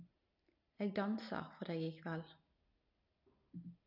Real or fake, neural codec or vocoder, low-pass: real; none; 5.4 kHz